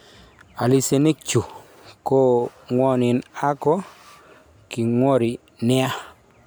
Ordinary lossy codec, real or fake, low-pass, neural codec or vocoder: none; real; none; none